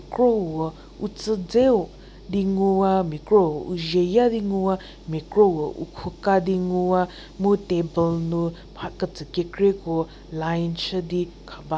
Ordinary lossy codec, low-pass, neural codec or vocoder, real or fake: none; none; none; real